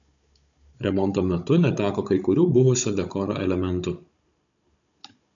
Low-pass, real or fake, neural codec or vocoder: 7.2 kHz; fake; codec, 16 kHz, 16 kbps, FunCodec, trained on Chinese and English, 50 frames a second